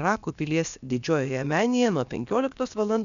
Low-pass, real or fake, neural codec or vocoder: 7.2 kHz; fake; codec, 16 kHz, about 1 kbps, DyCAST, with the encoder's durations